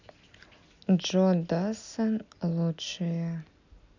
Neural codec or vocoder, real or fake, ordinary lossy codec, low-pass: none; real; none; 7.2 kHz